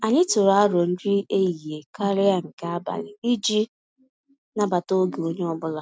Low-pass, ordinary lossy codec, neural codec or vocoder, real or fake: none; none; none; real